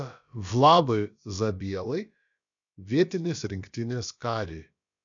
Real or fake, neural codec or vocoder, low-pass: fake; codec, 16 kHz, about 1 kbps, DyCAST, with the encoder's durations; 7.2 kHz